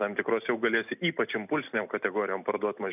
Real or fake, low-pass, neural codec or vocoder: real; 3.6 kHz; none